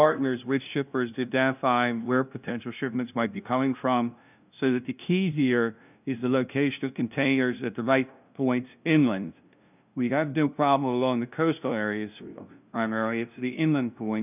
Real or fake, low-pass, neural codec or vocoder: fake; 3.6 kHz; codec, 16 kHz, 0.5 kbps, FunCodec, trained on LibriTTS, 25 frames a second